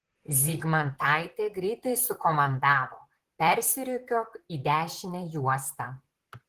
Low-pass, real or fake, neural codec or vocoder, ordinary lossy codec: 14.4 kHz; fake; vocoder, 44.1 kHz, 128 mel bands, Pupu-Vocoder; Opus, 16 kbps